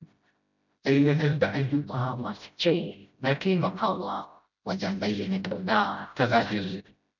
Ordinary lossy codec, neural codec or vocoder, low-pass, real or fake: none; codec, 16 kHz, 0.5 kbps, FreqCodec, smaller model; 7.2 kHz; fake